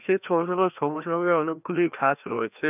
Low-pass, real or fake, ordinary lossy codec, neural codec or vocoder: 3.6 kHz; fake; none; codec, 16 kHz, 1 kbps, FreqCodec, larger model